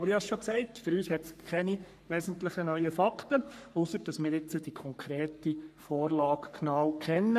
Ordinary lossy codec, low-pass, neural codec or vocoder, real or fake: none; 14.4 kHz; codec, 44.1 kHz, 3.4 kbps, Pupu-Codec; fake